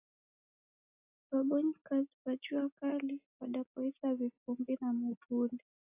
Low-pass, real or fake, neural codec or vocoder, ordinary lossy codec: 3.6 kHz; real; none; AAC, 32 kbps